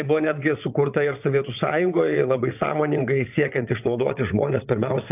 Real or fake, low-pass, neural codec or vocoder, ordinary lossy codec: fake; 3.6 kHz; vocoder, 22.05 kHz, 80 mel bands, WaveNeXt; AAC, 32 kbps